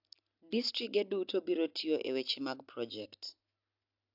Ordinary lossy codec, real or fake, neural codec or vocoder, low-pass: AAC, 48 kbps; real; none; 5.4 kHz